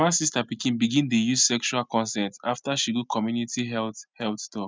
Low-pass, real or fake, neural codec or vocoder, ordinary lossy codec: none; real; none; none